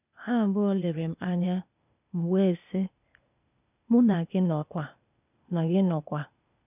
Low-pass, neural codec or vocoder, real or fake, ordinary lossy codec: 3.6 kHz; codec, 16 kHz, 0.8 kbps, ZipCodec; fake; none